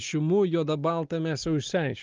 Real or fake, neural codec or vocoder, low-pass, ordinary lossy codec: real; none; 7.2 kHz; Opus, 32 kbps